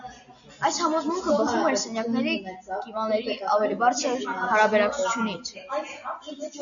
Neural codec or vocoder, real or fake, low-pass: none; real; 7.2 kHz